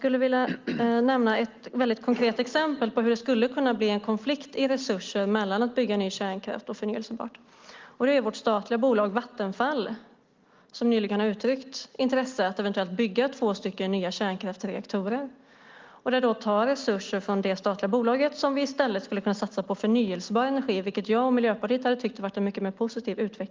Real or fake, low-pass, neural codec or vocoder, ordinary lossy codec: real; 7.2 kHz; none; Opus, 32 kbps